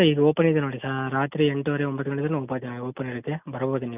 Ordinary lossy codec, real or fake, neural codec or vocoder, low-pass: none; real; none; 3.6 kHz